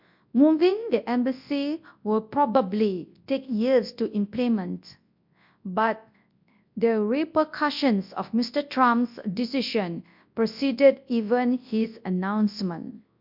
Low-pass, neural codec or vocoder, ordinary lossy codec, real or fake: 5.4 kHz; codec, 24 kHz, 0.9 kbps, WavTokenizer, large speech release; MP3, 48 kbps; fake